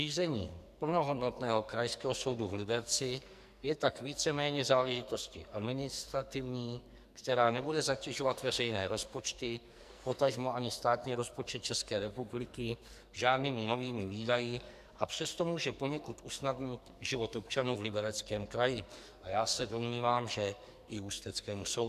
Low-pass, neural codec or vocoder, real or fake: 14.4 kHz; codec, 44.1 kHz, 2.6 kbps, SNAC; fake